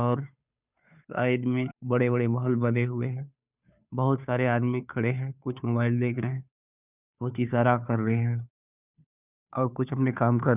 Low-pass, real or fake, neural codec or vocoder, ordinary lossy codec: 3.6 kHz; fake; codec, 16 kHz, 2 kbps, FunCodec, trained on Chinese and English, 25 frames a second; none